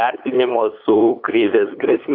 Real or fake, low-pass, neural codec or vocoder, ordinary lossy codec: fake; 5.4 kHz; codec, 16 kHz, 8 kbps, FunCodec, trained on LibriTTS, 25 frames a second; AAC, 32 kbps